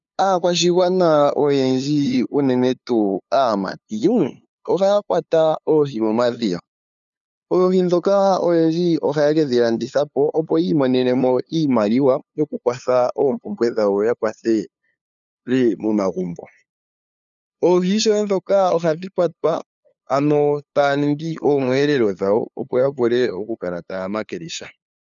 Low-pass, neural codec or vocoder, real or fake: 7.2 kHz; codec, 16 kHz, 2 kbps, FunCodec, trained on LibriTTS, 25 frames a second; fake